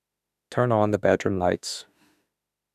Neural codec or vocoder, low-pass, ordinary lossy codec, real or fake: autoencoder, 48 kHz, 32 numbers a frame, DAC-VAE, trained on Japanese speech; 14.4 kHz; none; fake